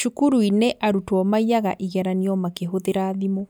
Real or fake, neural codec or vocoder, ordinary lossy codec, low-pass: real; none; none; none